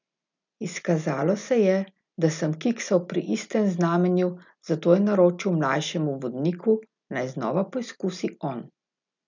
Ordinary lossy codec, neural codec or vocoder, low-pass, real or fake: none; none; 7.2 kHz; real